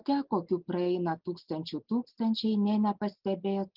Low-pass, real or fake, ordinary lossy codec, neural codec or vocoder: 5.4 kHz; real; Opus, 32 kbps; none